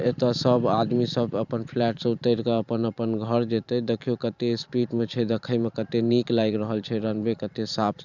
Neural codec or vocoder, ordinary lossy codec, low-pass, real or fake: vocoder, 44.1 kHz, 128 mel bands every 512 samples, BigVGAN v2; none; 7.2 kHz; fake